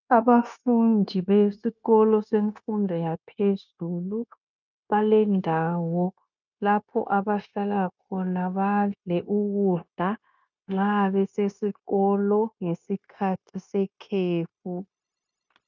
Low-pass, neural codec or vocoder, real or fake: 7.2 kHz; codec, 16 kHz, 0.9 kbps, LongCat-Audio-Codec; fake